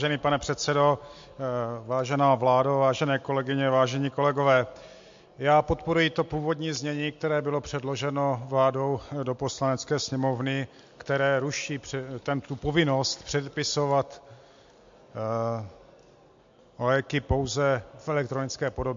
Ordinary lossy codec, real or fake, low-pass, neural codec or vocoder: MP3, 48 kbps; real; 7.2 kHz; none